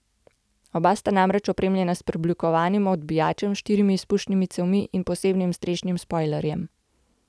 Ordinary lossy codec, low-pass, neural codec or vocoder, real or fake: none; none; none; real